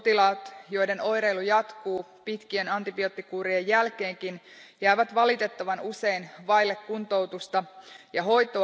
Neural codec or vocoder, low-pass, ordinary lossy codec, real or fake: none; none; none; real